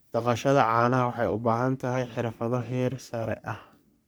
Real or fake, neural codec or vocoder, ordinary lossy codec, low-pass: fake; codec, 44.1 kHz, 3.4 kbps, Pupu-Codec; none; none